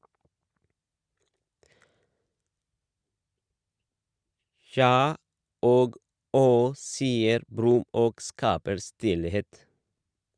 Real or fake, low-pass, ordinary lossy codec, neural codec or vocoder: real; 9.9 kHz; Opus, 64 kbps; none